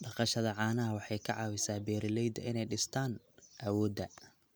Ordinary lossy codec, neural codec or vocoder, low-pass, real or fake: none; none; none; real